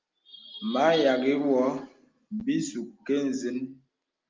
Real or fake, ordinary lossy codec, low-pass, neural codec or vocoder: real; Opus, 24 kbps; 7.2 kHz; none